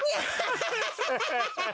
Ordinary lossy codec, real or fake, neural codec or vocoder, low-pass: none; real; none; none